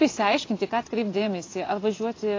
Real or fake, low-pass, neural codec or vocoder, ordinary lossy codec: fake; 7.2 kHz; vocoder, 24 kHz, 100 mel bands, Vocos; AAC, 32 kbps